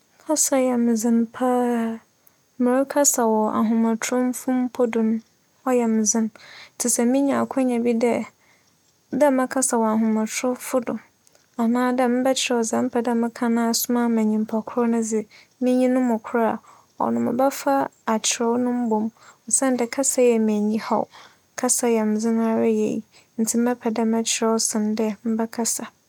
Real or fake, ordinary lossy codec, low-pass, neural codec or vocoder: real; none; 19.8 kHz; none